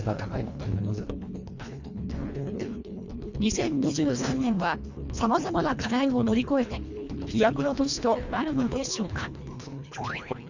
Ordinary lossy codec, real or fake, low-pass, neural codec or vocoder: Opus, 64 kbps; fake; 7.2 kHz; codec, 24 kHz, 1.5 kbps, HILCodec